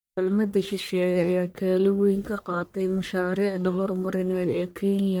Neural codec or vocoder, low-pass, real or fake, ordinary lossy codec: codec, 44.1 kHz, 1.7 kbps, Pupu-Codec; none; fake; none